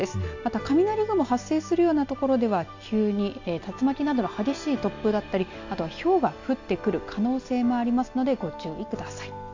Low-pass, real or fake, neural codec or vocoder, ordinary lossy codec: 7.2 kHz; real; none; AAC, 48 kbps